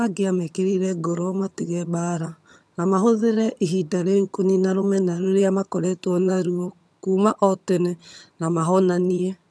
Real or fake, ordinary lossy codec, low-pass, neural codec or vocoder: fake; none; none; vocoder, 22.05 kHz, 80 mel bands, HiFi-GAN